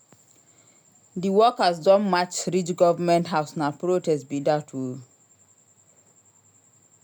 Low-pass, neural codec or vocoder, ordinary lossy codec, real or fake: none; none; none; real